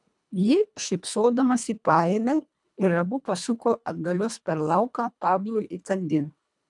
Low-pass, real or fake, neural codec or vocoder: 10.8 kHz; fake; codec, 24 kHz, 1.5 kbps, HILCodec